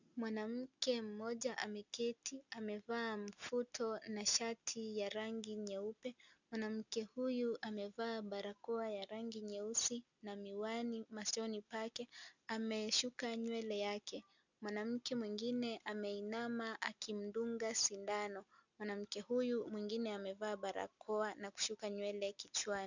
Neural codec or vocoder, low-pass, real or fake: none; 7.2 kHz; real